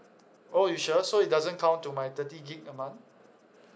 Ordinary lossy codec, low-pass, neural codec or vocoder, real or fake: none; none; none; real